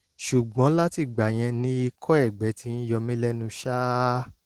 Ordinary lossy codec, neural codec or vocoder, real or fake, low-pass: Opus, 16 kbps; none; real; 19.8 kHz